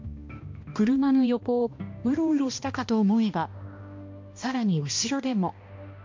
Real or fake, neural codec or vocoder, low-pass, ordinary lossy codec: fake; codec, 16 kHz, 1 kbps, X-Codec, HuBERT features, trained on balanced general audio; 7.2 kHz; MP3, 48 kbps